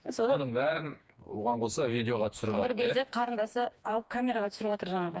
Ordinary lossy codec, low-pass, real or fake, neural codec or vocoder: none; none; fake; codec, 16 kHz, 2 kbps, FreqCodec, smaller model